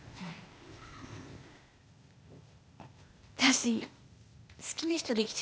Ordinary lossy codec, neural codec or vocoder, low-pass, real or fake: none; codec, 16 kHz, 0.8 kbps, ZipCodec; none; fake